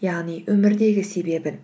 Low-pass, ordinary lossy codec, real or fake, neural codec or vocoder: none; none; real; none